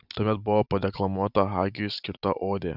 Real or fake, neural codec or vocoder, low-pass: real; none; 5.4 kHz